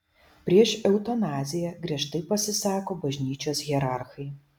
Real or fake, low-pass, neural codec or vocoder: real; 19.8 kHz; none